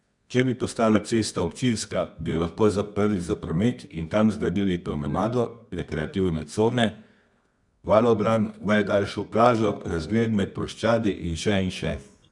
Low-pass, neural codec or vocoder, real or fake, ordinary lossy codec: 10.8 kHz; codec, 24 kHz, 0.9 kbps, WavTokenizer, medium music audio release; fake; none